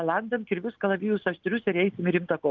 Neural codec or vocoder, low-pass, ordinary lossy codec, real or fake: none; 7.2 kHz; Opus, 24 kbps; real